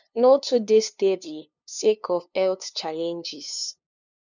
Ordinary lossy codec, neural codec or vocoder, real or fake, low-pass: none; codec, 16 kHz, 2 kbps, FunCodec, trained on LibriTTS, 25 frames a second; fake; 7.2 kHz